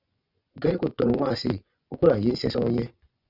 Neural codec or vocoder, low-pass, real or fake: vocoder, 24 kHz, 100 mel bands, Vocos; 5.4 kHz; fake